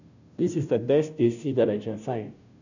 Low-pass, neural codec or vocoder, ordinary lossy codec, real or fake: 7.2 kHz; codec, 16 kHz, 0.5 kbps, FunCodec, trained on Chinese and English, 25 frames a second; none; fake